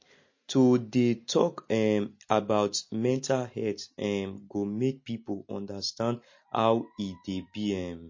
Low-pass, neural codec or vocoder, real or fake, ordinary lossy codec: 7.2 kHz; none; real; MP3, 32 kbps